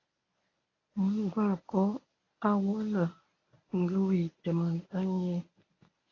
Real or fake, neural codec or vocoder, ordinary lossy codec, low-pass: fake; codec, 24 kHz, 0.9 kbps, WavTokenizer, medium speech release version 1; AAC, 32 kbps; 7.2 kHz